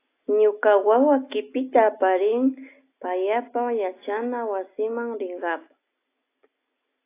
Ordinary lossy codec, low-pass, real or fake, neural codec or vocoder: AAC, 24 kbps; 3.6 kHz; real; none